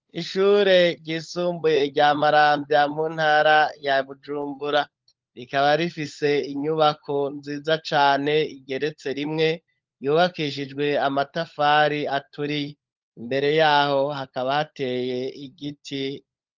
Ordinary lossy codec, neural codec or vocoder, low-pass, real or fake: Opus, 32 kbps; codec, 16 kHz, 16 kbps, FunCodec, trained on LibriTTS, 50 frames a second; 7.2 kHz; fake